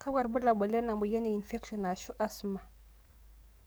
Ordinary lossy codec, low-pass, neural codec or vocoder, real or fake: none; none; codec, 44.1 kHz, 7.8 kbps, DAC; fake